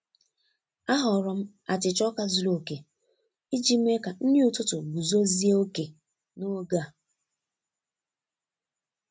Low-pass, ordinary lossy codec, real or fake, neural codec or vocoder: none; none; real; none